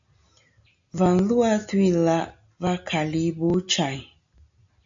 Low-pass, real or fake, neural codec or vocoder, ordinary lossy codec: 7.2 kHz; real; none; AAC, 64 kbps